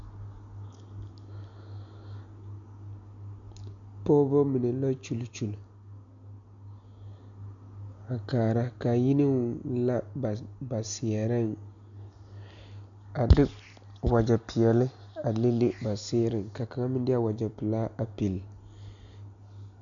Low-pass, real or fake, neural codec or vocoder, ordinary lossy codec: 7.2 kHz; real; none; MP3, 96 kbps